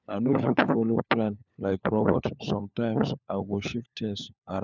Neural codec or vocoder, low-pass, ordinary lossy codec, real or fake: codec, 16 kHz, 4 kbps, FunCodec, trained on LibriTTS, 50 frames a second; 7.2 kHz; none; fake